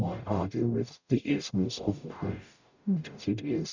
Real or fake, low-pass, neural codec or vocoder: fake; 7.2 kHz; codec, 44.1 kHz, 0.9 kbps, DAC